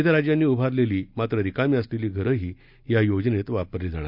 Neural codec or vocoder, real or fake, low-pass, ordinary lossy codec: none; real; 5.4 kHz; none